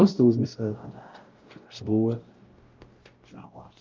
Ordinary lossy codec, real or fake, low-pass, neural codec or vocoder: Opus, 32 kbps; fake; 7.2 kHz; codec, 16 kHz, 0.5 kbps, X-Codec, WavLM features, trained on Multilingual LibriSpeech